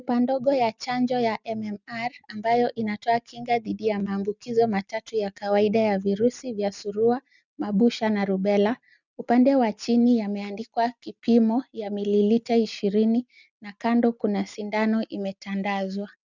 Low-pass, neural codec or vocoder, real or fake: 7.2 kHz; none; real